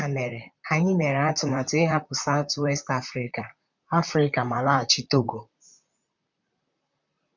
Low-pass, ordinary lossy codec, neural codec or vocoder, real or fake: 7.2 kHz; none; vocoder, 44.1 kHz, 128 mel bands, Pupu-Vocoder; fake